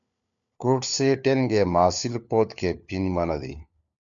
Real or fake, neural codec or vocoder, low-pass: fake; codec, 16 kHz, 4 kbps, FunCodec, trained on LibriTTS, 50 frames a second; 7.2 kHz